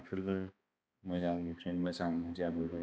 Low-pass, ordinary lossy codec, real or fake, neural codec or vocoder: none; none; fake; codec, 16 kHz, 2 kbps, X-Codec, HuBERT features, trained on balanced general audio